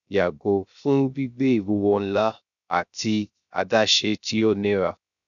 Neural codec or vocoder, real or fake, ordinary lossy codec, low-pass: codec, 16 kHz, 0.3 kbps, FocalCodec; fake; none; 7.2 kHz